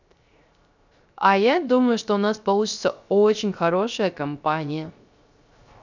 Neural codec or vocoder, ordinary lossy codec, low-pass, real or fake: codec, 16 kHz, 0.3 kbps, FocalCodec; none; 7.2 kHz; fake